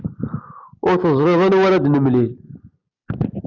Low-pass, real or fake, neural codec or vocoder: 7.2 kHz; real; none